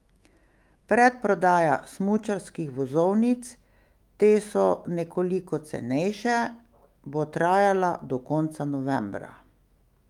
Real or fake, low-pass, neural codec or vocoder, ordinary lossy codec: fake; 19.8 kHz; autoencoder, 48 kHz, 128 numbers a frame, DAC-VAE, trained on Japanese speech; Opus, 32 kbps